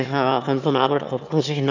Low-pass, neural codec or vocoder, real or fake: 7.2 kHz; autoencoder, 22.05 kHz, a latent of 192 numbers a frame, VITS, trained on one speaker; fake